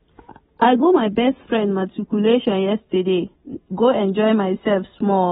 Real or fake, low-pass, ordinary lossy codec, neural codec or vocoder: real; 7.2 kHz; AAC, 16 kbps; none